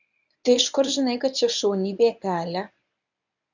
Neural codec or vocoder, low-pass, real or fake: codec, 24 kHz, 0.9 kbps, WavTokenizer, medium speech release version 1; 7.2 kHz; fake